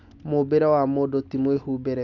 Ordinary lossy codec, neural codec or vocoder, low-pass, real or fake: none; none; 7.2 kHz; real